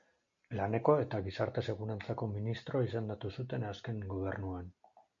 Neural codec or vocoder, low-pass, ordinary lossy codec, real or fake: none; 7.2 kHz; MP3, 48 kbps; real